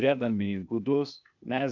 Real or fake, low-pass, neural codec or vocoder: fake; 7.2 kHz; codec, 16 kHz, 0.8 kbps, ZipCodec